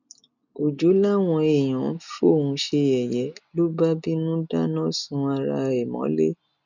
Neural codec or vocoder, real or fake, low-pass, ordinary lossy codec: none; real; 7.2 kHz; none